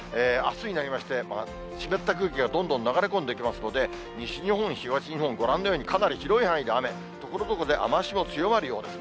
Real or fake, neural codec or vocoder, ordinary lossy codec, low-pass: real; none; none; none